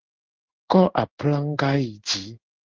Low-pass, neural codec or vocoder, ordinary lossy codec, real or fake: 7.2 kHz; codec, 16 kHz in and 24 kHz out, 1 kbps, XY-Tokenizer; Opus, 16 kbps; fake